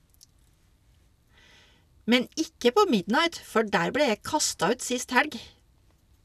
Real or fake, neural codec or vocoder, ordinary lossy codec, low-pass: real; none; none; 14.4 kHz